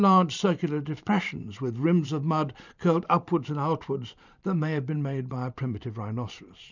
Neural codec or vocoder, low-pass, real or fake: vocoder, 44.1 kHz, 128 mel bands every 512 samples, BigVGAN v2; 7.2 kHz; fake